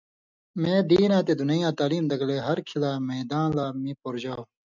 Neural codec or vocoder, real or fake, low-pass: none; real; 7.2 kHz